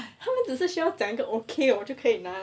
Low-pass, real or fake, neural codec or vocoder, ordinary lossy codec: none; real; none; none